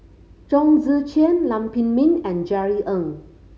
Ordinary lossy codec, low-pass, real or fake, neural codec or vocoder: none; none; real; none